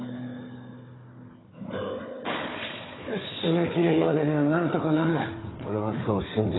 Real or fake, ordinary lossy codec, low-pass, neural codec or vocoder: fake; AAC, 16 kbps; 7.2 kHz; codec, 16 kHz, 4 kbps, FunCodec, trained on Chinese and English, 50 frames a second